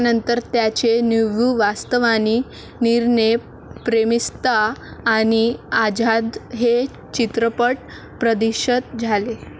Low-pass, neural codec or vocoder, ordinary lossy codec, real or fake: none; none; none; real